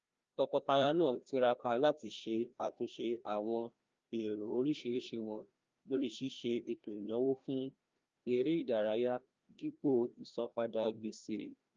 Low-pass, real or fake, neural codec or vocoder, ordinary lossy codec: 7.2 kHz; fake; codec, 16 kHz, 1 kbps, FreqCodec, larger model; Opus, 24 kbps